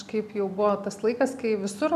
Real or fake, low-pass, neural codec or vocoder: real; 14.4 kHz; none